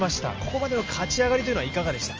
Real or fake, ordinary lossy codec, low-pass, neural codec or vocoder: real; Opus, 24 kbps; 7.2 kHz; none